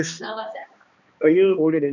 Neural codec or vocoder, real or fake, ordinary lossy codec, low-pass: codec, 16 kHz, 2 kbps, X-Codec, HuBERT features, trained on balanced general audio; fake; none; 7.2 kHz